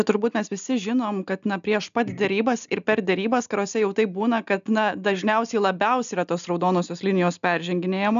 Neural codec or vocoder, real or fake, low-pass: none; real; 7.2 kHz